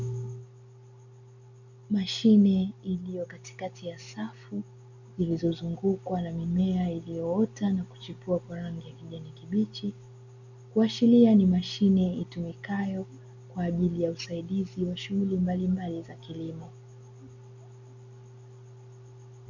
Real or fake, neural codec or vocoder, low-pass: real; none; 7.2 kHz